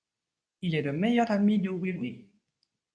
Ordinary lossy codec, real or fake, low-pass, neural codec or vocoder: MP3, 48 kbps; fake; 9.9 kHz; codec, 24 kHz, 0.9 kbps, WavTokenizer, medium speech release version 2